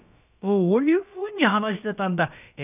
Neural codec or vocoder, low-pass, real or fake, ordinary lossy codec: codec, 16 kHz, about 1 kbps, DyCAST, with the encoder's durations; 3.6 kHz; fake; none